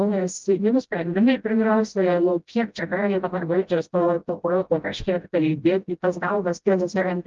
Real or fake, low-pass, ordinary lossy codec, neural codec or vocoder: fake; 7.2 kHz; Opus, 32 kbps; codec, 16 kHz, 0.5 kbps, FreqCodec, smaller model